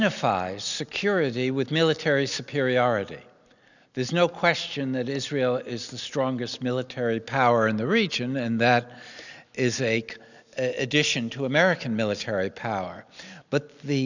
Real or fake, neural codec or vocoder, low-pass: real; none; 7.2 kHz